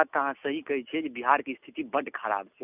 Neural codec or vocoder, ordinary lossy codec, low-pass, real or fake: none; none; 3.6 kHz; real